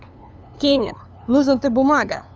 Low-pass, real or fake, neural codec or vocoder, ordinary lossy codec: none; fake; codec, 16 kHz, 2 kbps, FunCodec, trained on LibriTTS, 25 frames a second; none